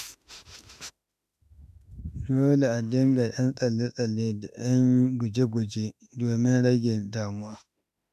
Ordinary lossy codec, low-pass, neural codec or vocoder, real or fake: none; 14.4 kHz; autoencoder, 48 kHz, 32 numbers a frame, DAC-VAE, trained on Japanese speech; fake